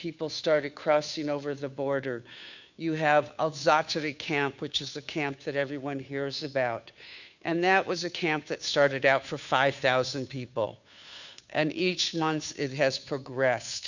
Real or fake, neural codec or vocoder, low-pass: fake; codec, 16 kHz, 2 kbps, FunCodec, trained on Chinese and English, 25 frames a second; 7.2 kHz